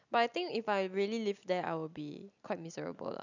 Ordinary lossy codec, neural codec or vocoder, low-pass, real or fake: none; none; 7.2 kHz; real